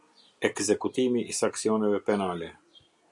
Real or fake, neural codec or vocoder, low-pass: real; none; 10.8 kHz